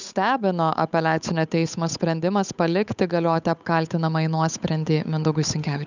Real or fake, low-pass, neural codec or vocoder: fake; 7.2 kHz; codec, 16 kHz, 8 kbps, FunCodec, trained on Chinese and English, 25 frames a second